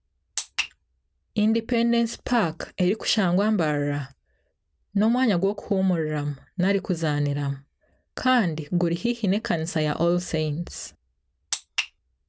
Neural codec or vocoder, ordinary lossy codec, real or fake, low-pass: none; none; real; none